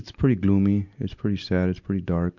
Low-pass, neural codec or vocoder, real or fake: 7.2 kHz; none; real